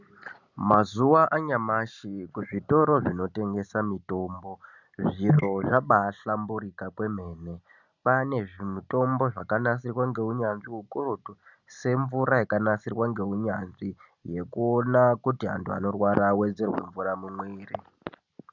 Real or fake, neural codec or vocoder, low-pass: real; none; 7.2 kHz